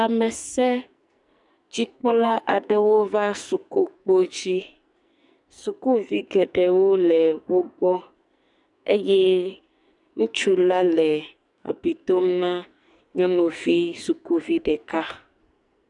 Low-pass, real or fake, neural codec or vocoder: 10.8 kHz; fake; codec, 44.1 kHz, 2.6 kbps, SNAC